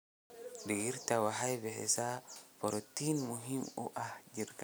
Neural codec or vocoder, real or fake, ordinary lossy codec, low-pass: none; real; none; none